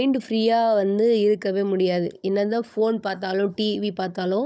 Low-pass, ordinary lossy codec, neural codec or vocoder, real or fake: none; none; codec, 16 kHz, 16 kbps, FunCodec, trained on Chinese and English, 50 frames a second; fake